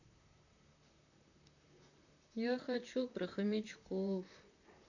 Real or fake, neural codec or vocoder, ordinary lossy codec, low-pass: fake; vocoder, 44.1 kHz, 128 mel bands, Pupu-Vocoder; none; 7.2 kHz